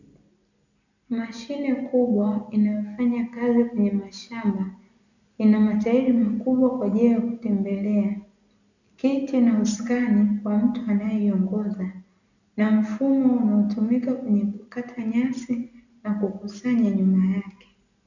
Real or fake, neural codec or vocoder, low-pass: real; none; 7.2 kHz